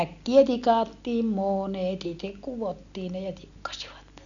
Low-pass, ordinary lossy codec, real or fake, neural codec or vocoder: 7.2 kHz; none; real; none